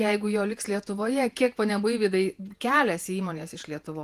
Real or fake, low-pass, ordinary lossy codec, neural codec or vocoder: fake; 14.4 kHz; Opus, 24 kbps; vocoder, 48 kHz, 128 mel bands, Vocos